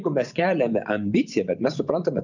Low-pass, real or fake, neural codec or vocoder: 7.2 kHz; fake; vocoder, 24 kHz, 100 mel bands, Vocos